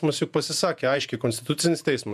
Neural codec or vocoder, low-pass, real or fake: vocoder, 48 kHz, 128 mel bands, Vocos; 14.4 kHz; fake